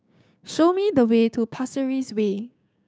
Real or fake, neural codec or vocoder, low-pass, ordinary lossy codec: fake; codec, 16 kHz, 6 kbps, DAC; none; none